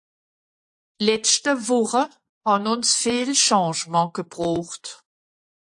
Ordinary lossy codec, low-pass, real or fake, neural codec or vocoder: AAC, 64 kbps; 10.8 kHz; fake; vocoder, 24 kHz, 100 mel bands, Vocos